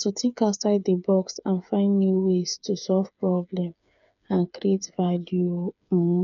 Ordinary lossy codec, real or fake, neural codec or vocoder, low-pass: none; fake; codec, 16 kHz, 8 kbps, FreqCodec, smaller model; 7.2 kHz